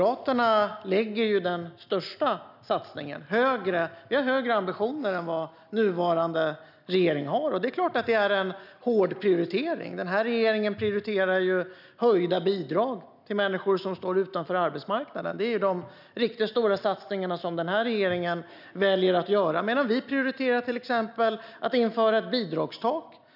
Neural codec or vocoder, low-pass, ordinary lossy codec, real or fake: none; 5.4 kHz; none; real